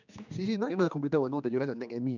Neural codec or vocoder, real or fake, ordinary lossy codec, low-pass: codec, 16 kHz, 2 kbps, X-Codec, HuBERT features, trained on general audio; fake; none; 7.2 kHz